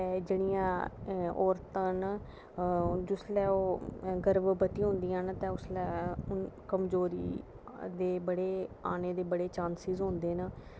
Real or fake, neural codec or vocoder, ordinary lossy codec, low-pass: real; none; none; none